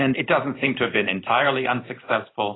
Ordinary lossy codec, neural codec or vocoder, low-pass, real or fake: AAC, 16 kbps; none; 7.2 kHz; real